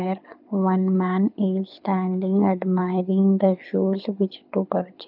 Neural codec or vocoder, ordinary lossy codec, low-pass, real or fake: codec, 24 kHz, 6 kbps, HILCodec; none; 5.4 kHz; fake